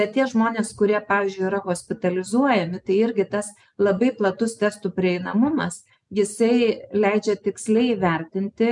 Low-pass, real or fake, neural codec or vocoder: 10.8 kHz; real; none